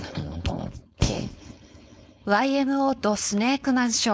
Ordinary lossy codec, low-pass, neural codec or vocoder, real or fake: none; none; codec, 16 kHz, 4.8 kbps, FACodec; fake